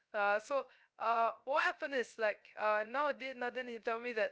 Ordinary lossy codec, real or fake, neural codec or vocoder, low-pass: none; fake; codec, 16 kHz, 0.3 kbps, FocalCodec; none